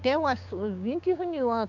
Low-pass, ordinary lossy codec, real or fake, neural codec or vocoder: 7.2 kHz; none; fake; codec, 16 kHz, 6 kbps, DAC